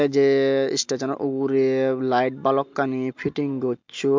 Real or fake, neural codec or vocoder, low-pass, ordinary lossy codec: real; none; 7.2 kHz; MP3, 64 kbps